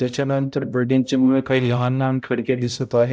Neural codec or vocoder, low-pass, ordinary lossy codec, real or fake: codec, 16 kHz, 0.5 kbps, X-Codec, HuBERT features, trained on balanced general audio; none; none; fake